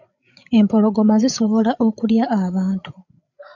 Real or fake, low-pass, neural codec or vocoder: fake; 7.2 kHz; codec, 16 kHz, 8 kbps, FreqCodec, larger model